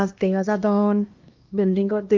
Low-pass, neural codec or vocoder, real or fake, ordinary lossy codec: 7.2 kHz; codec, 16 kHz, 1 kbps, X-Codec, HuBERT features, trained on LibriSpeech; fake; Opus, 32 kbps